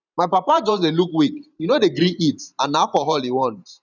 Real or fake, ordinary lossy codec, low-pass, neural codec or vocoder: fake; none; 7.2 kHz; vocoder, 44.1 kHz, 128 mel bands every 512 samples, BigVGAN v2